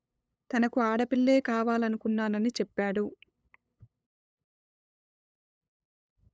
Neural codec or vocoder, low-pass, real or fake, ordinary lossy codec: codec, 16 kHz, 8 kbps, FunCodec, trained on LibriTTS, 25 frames a second; none; fake; none